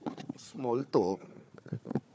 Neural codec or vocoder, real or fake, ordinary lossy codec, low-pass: codec, 16 kHz, 4 kbps, FunCodec, trained on Chinese and English, 50 frames a second; fake; none; none